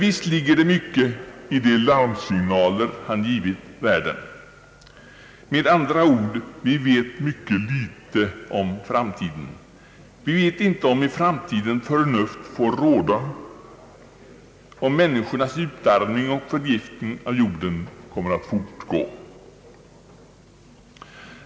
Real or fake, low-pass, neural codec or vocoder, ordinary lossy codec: real; none; none; none